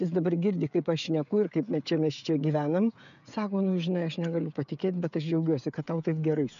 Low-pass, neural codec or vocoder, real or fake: 7.2 kHz; codec, 16 kHz, 16 kbps, FreqCodec, smaller model; fake